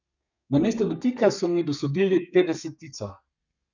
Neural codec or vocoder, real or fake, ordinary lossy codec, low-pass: codec, 32 kHz, 1.9 kbps, SNAC; fake; none; 7.2 kHz